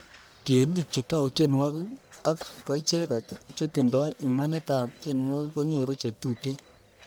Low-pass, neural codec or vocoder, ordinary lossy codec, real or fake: none; codec, 44.1 kHz, 1.7 kbps, Pupu-Codec; none; fake